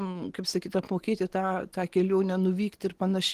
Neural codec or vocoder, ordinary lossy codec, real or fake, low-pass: none; Opus, 16 kbps; real; 14.4 kHz